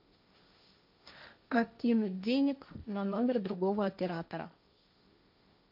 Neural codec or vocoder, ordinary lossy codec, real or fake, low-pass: codec, 16 kHz, 1.1 kbps, Voila-Tokenizer; MP3, 48 kbps; fake; 5.4 kHz